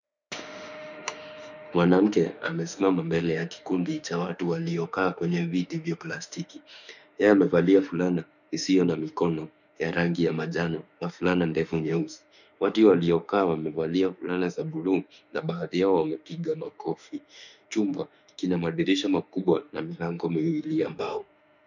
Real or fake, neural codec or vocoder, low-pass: fake; autoencoder, 48 kHz, 32 numbers a frame, DAC-VAE, trained on Japanese speech; 7.2 kHz